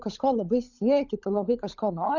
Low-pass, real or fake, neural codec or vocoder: 7.2 kHz; fake; codec, 16 kHz, 16 kbps, FunCodec, trained on LibriTTS, 50 frames a second